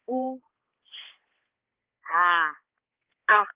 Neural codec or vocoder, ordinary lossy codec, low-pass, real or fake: codec, 16 kHz, 4 kbps, X-Codec, HuBERT features, trained on balanced general audio; Opus, 16 kbps; 3.6 kHz; fake